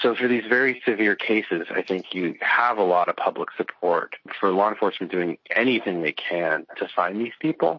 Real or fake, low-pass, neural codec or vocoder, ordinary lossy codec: real; 7.2 kHz; none; MP3, 32 kbps